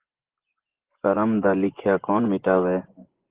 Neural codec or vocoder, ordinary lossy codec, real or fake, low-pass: vocoder, 24 kHz, 100 mel bands, Vocos; Opus, 16 kbps; fake; 3.6 kHz